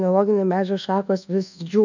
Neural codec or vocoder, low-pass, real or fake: codec, 24 kHz, 1.2 kbps, DualCodec; 7.2 kHz; fake